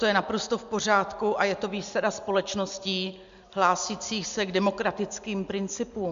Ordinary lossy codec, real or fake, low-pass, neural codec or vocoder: MP3, 64 kbps; real; 7.2 kHz; none